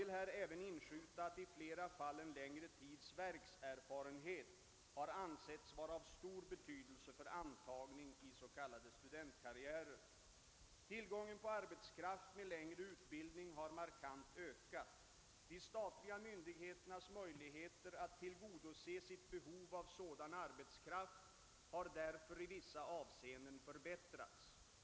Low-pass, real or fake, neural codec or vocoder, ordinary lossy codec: none; real; none; none